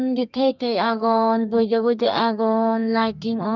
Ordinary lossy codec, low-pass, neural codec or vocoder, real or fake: none; 7.2 kHz; codec, 32 kHz, 1.9 kbps, SNAC; fake